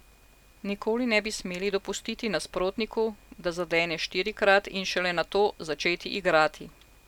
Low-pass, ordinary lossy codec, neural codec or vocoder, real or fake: 19.8 kHz; none; none; real